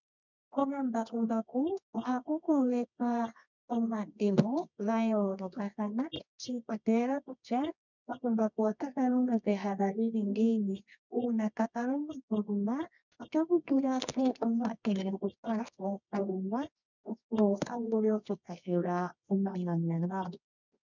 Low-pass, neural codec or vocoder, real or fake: 7.2 kHz; codec, 24 kHz, 0.9 kbps, WavTokenizer, medium music audio release; fake